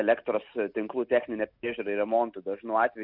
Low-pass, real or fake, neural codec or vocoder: 5.4 kHz; real; none